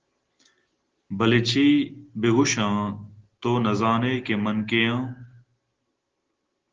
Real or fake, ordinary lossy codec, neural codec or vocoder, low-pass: real; Opus, 16 kbps; none; 7.2 kHz